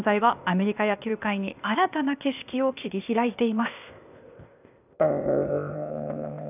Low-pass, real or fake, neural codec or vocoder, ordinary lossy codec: 3.6 kHz; fake; codec, 16 kHz, 0.8 kbps, ZipCodec; none